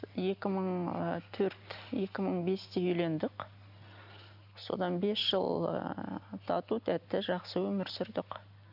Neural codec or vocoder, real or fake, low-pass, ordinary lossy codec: none; real; 5.4 kHz; none